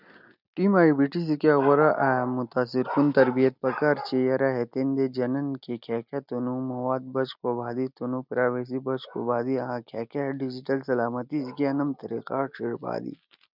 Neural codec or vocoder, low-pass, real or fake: none; 5.4 kHz; real